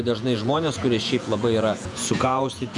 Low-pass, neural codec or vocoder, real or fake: 10.8 kHz; vocoder, 48 kHz, 128 mel bands, Vocos; fake